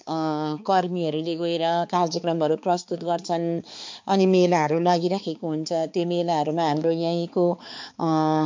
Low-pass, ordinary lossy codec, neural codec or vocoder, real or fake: 7.2 kHz; MP3, 48 kbps; codec, 16 kHz, 4 kbps, X-Codec, HuBERT features, trained on balanced general audio; fake